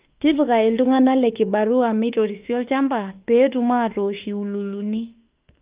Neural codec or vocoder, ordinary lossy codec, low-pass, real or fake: autoencoder, 48 kHz, 32 numbers a frame, DAC-VAE, trained on Japanese speech; Opus, 32 kbps; 3.6 kHz; fake